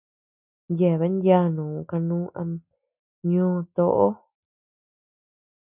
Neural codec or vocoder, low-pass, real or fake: none; 3.6 kHz; real